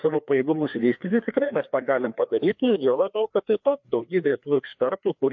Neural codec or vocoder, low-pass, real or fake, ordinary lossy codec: codec, 16 kHz, 2 kbps, FreqCodec, larger model; 7.2 kHz; fake; MP3, 48 kbps